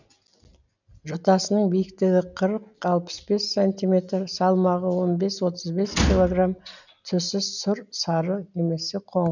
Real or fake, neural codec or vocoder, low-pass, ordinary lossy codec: real; none; 7.2 kHz; none